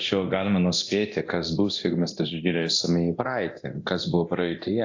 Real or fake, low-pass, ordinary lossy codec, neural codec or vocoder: fake; 7.2 kHz; AAC, 48 kbps; codec, 24 kHz, 0.9 kbps, DualCodec